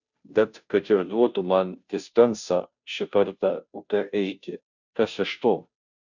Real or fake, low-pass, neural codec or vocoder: fake; 7.2 kHz; codec, 16 kHz, 0.5 kbps, FunCodec, trained on Chinese and English, 25 frames a second